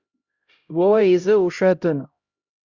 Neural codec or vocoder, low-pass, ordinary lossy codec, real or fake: codec, 16 kHz, 0.5 kbps, X-Codec, HuBERT features, trained on LibriSpeech; 7.2 kHz; Opus, 64 kbps; fake